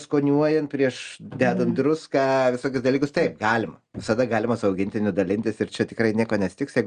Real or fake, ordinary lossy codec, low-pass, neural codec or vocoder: real; Opus, 32 kbps; 9.9 kHz; none